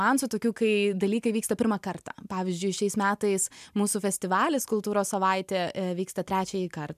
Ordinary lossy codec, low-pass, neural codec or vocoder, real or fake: MP3, 96 kbps; 14.4 kHz; none; real